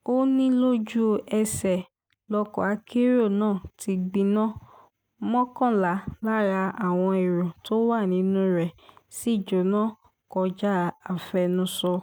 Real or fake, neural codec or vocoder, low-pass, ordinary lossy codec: real; none; none; none